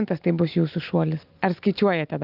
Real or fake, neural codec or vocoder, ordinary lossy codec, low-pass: real; none; Opus, 32 kbps; 5.4 kHz